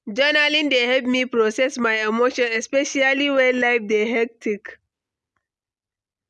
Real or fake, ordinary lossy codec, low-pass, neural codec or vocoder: real; none; none; none